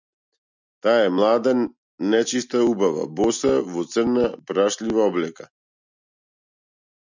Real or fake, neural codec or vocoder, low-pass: real; none; 7.2 kHz